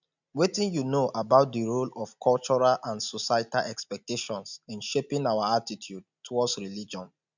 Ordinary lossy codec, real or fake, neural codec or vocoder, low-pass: none; real; none; 7.2 kHz